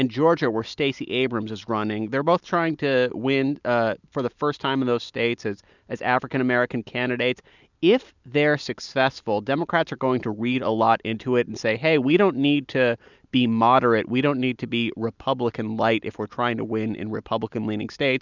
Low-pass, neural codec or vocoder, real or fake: 7.2 kHz; none; real